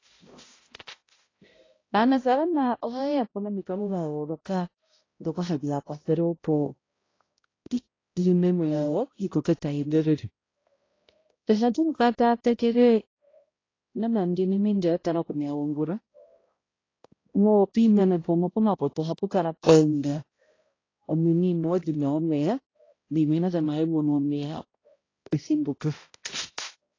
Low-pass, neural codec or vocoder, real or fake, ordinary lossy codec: 7.2 kHz; codec, 16 kHz, 0.5 kbps, X-Codec, HuBERT features, trained on balanced general audio; fake; AAC, 32 kbps